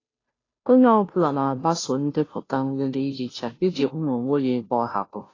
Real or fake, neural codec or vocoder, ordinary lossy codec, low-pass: fake; codec, 16 kHz, 0.5 kbps, FunCodec, trained on Chinese and English, 25 frames a second; AAC, 32 kbps; 7.2 kHz